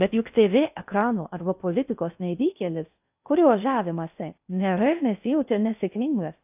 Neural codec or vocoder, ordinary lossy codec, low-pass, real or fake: codec, 16 kHz in and 24 kHz out, 0.6 kbps, FocalCodec, streaming, 4096 codes; AAC, 32 kbps; 3.6 kHz; fake